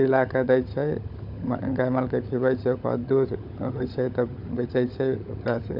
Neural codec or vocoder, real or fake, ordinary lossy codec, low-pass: codec, 16 kHz, 16 kbps, FunCodec, trained on LibriTTS, 50 frames a second; fake; none; 5.4 kHz